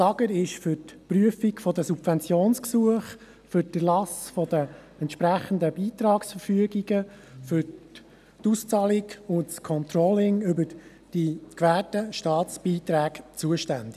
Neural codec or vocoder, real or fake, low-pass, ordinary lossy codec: none; real; 14.4 kHz; none